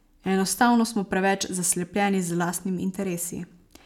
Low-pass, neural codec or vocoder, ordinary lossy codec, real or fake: 19.8 kHz; none; none; real